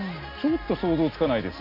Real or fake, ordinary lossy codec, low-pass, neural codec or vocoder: real; none; 5.4 kHz; none